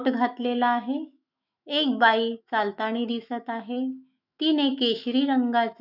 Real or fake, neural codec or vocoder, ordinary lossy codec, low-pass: fake; autoencoder, 48 kHz, 128 numbers a frame, DAC-VAE, trained on Japanese speech; none; 5.4 kHz